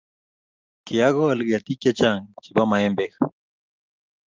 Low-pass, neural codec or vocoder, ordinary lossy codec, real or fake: 7.2 kHz; none; Opus, 24 kbps; real